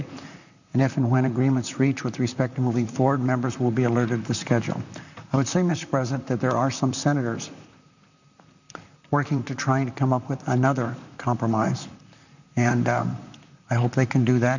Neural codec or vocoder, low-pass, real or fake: vocoder, 44.1 kHz, 128 mel bands, Pupu-Vocoder; 7.2 kHz; fake